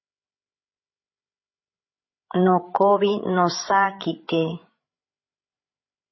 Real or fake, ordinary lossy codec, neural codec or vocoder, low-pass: fake; MP3, 24 kbps; codec, 16 kHz, 16 kbps, FreqCodec, larger model; 7.2 kHz